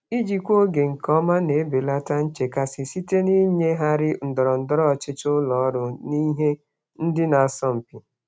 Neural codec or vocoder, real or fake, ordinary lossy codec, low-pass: none; real; none; none